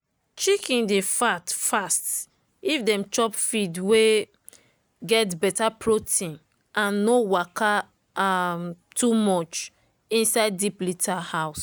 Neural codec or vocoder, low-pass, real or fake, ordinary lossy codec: none; none; real; none